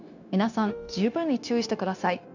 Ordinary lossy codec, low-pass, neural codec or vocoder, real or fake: none; 7.2 kHz; codec, 16 kHz, 0.9 kbps, LongCat-Audio-Codec; fake